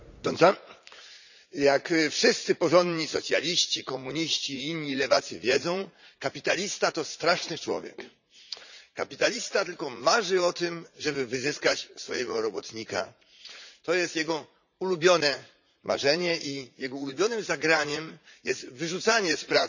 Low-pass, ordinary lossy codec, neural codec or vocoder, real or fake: 7.2 kHz; none; vocoder, 44.1 kHz, 80 mel bands, Vocos; fake